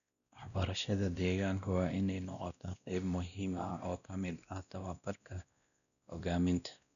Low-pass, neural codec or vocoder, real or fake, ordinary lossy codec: 7.2 kHz; codec, 16 kHz, 1 kbps, X-Codec, WavLM features, trained on Multilingual LibriSpeech; fake; none